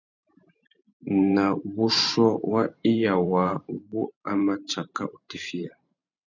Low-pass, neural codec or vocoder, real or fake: 7.2 kHz; vocoder, 44.1 kHz, 128 mel bands every 512 samples, BigVGAN v2; fake